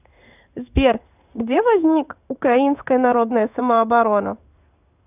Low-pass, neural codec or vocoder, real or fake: 3.6 kHz; codec, 16 kHz in and 24 kHz out, 1 kbps, XY-Tokenizer; fake